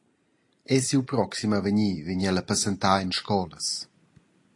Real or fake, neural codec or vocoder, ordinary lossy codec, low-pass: real; none; AAC, 48 kbps; 10.8 kHz